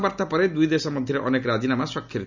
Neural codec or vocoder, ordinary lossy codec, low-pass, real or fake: none; none; 7.2 kHz; real